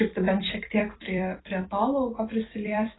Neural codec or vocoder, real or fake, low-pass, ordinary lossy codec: vocoder, 44.1 kHz, 128 mel bands every 256 samples, BigVGAN v2; fake; 7.2 kHz; AAC, 16 kbps